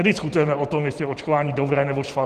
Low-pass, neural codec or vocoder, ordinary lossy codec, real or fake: 14.4 kHz; vocoder, 44.1 kHz, 128 mel bands every 512 samples, BigVGAN v2; Opus, 16 kbps; fake